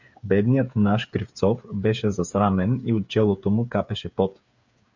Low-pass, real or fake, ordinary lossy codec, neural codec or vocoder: 7.2 kHz; fake; MP3, 64 kbps; codec, 16 kHz, 8 kbps, FreqCodec, smaller model